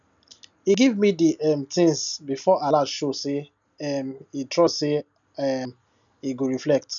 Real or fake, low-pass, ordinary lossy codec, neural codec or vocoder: real; 7.2 kHz; none; none